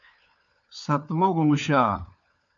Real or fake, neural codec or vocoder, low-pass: fake; codec, 16 kHz, 4 kbps, FunCodec, trained on LibriTTS, 50 frames a second; 7.2 kHz